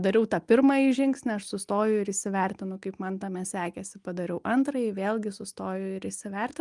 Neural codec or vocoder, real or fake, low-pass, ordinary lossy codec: none; real; 10.8 kHz; Opus, 32 kbps